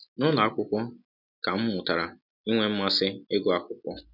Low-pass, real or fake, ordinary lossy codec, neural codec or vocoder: 5.4 kHz; real; none; none